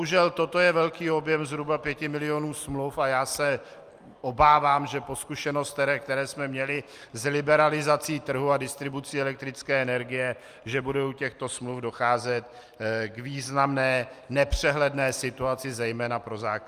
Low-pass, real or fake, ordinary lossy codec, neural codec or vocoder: 14.4 kHz; real; Opus, 32 kbps; none